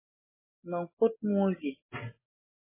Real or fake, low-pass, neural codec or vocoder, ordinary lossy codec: real; 3.6 kHz; none; MP3, 16 kbps